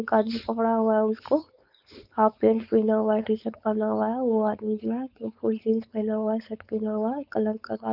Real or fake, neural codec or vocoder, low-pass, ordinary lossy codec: fake; codec, 16 kHz, 4.8 kbps, FACodec; 5.4 kHz; none